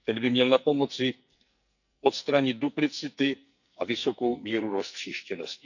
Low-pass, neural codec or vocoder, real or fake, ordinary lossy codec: 7.2 kHz; codec, 44.1 kHz, 2.6 kbps, SNAC; fake; none